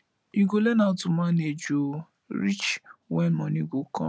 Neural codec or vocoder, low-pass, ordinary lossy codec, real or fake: none; none; none; real